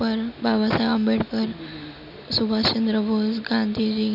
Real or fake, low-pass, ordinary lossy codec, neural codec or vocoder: real; 5.4 kHz; none; none